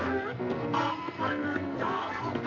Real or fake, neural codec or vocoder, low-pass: fake; codec, 44.1 kHz, 2.6 kbps, SNAC; 7.2 kHz